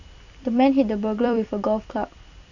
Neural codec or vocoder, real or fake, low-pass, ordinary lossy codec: vocoder, 44.1 kHz, 128 mel bands every 256 samples, BigVGAN v2; fake; 7.2 kHz; none